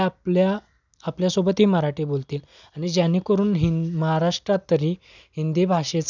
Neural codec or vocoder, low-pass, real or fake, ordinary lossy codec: none; 7.2 kHz; real; none